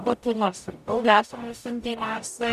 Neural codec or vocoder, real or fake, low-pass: codec, 44.1 kHz, 0.9 kbps, DAC; fake; 14.4 kHz